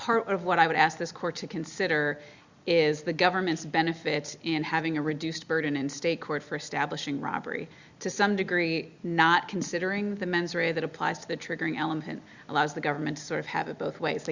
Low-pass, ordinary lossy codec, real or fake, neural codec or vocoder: 7.2 kHz; Opus, 64 kbps; real; none